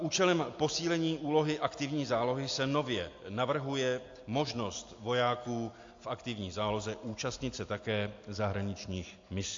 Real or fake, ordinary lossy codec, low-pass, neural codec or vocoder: real; AAC, 48 kbps; 7.2 kHz; none